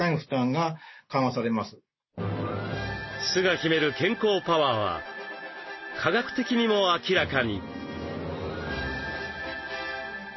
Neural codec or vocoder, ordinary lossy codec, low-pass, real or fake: none; MP3, 24 kbps; 7.2 kHz; real